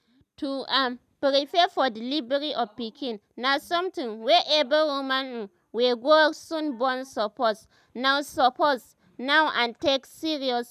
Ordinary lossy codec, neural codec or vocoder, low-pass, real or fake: none; none; 14.4 kHz; real